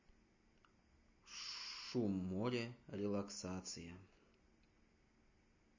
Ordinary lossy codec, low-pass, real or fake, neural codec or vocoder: MP3, 32 kbps; 7.2 kHz; real; none